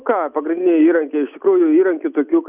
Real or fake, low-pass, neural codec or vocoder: real; 3.6 kHz; none